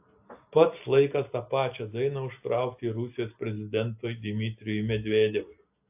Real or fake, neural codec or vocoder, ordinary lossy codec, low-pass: real; none; AAC, 32 kbps; 3.6 kHz